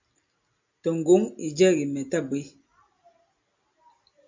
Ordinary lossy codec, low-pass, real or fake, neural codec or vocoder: MP3, 64 kbps; 7.2 kHz; real; none